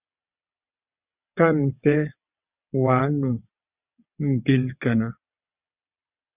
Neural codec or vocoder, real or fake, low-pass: vocoder, 22.05 kHz, 80 mel bands, WaveNeXt; fake; 3.6 kHz